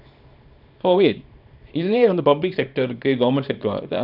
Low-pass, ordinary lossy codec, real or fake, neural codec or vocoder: 5.4 kHz; none; fake; codec, 24 kHz, 0.9 kbps, WavTokenizer, small release